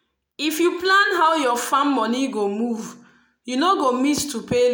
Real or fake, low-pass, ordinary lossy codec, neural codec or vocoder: real; none; none; none